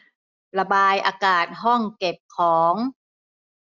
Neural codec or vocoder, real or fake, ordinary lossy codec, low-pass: none; real; none; 7.2 kHz